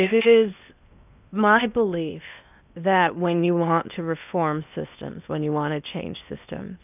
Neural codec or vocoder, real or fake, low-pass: codec, 16 kHz in and 24 kHz out, 0.8 kbps, FocalCodec, streaming, 65536 codes; fake; 3.6 kHz